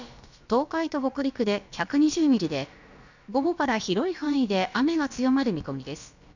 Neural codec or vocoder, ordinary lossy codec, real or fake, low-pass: codec, 16 kHz, about 1 kbps, DyCAST, with the encoder's durations; none; fake; 7.2 kHz